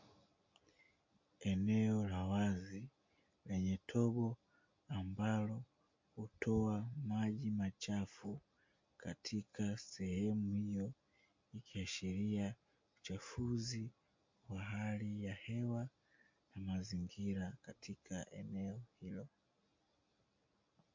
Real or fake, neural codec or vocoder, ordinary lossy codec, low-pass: real; none; MP3, 48 kbps; 7.2 kHz